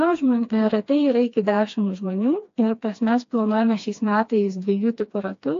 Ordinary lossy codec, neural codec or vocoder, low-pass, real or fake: AAC, 48 kbps; codec, 16 kHz, 2 kbps, FreqCodec, smaller model; 7.2 kHz; fake